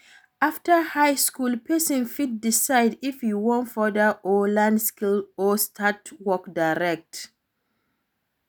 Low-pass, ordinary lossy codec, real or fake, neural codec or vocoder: none; none; real; none